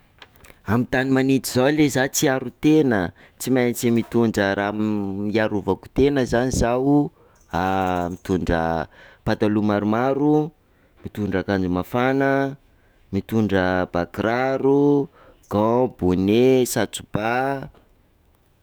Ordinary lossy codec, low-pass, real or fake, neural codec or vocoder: none; none; fake; autoencoder, 48 kHz, 128 numbers a frame, DAC-VAE, trained on Japanese speech